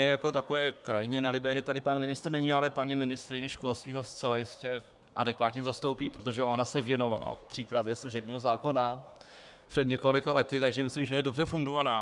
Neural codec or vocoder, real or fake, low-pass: codec, 24 kHz, 1 kbps, SNAC; fake; 10.8 kHz